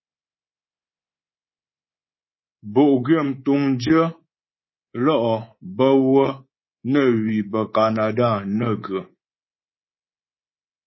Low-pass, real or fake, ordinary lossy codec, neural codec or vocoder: 7.2 kHz; fake; MP3, 24 kbps; codec, 24 kHz, 3.1 kbps, DualCodec